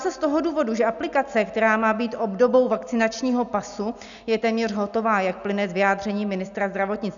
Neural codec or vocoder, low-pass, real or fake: none; 7.2 kHz; real